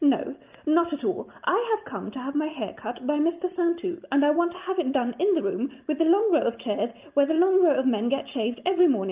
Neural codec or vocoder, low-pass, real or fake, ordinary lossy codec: none; 3.6 kHz; real; Opus, 24 kbps